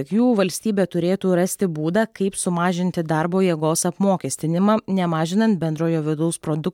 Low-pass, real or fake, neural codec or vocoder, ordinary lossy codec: 19.8 kHz; real; none; MP3, 96 kbps